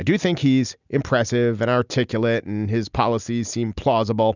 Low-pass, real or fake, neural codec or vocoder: 7.2 kHz; real; none